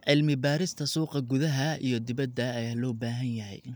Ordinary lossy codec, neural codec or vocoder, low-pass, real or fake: none; none; none; real